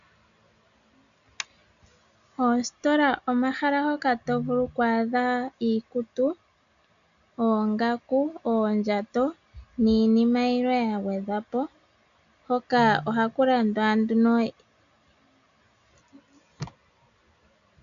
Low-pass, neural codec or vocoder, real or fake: 7.2 kHz; none; real